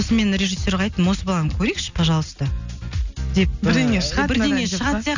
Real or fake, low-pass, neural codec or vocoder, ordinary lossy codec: real; 7.2 kHz; none; none